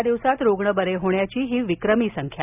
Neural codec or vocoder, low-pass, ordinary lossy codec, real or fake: none; 3.6 kHz; none; real